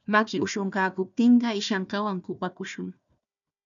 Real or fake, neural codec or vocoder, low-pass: fake; codec, 16 kHz, 1 kbps, FunCodec, trained on Chinese and English, 50 frames a second; 7.2 kHz